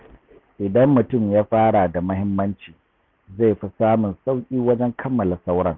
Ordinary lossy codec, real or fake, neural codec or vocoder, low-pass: none; real; none; 7.2 kHz